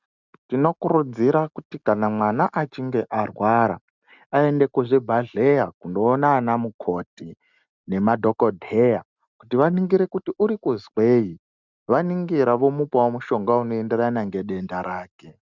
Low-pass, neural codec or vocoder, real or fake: 7.2 kHz; none; real